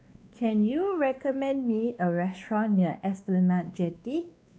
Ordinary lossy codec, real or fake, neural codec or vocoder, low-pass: none; fake; codec, 16 kHz, 2 kbps, X-Codec, WavLM features, trained on Multilingual LibriSpeech; none